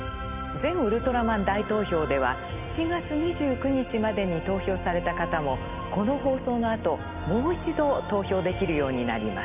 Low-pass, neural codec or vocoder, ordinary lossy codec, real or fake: 3.6 kHz; none; none; real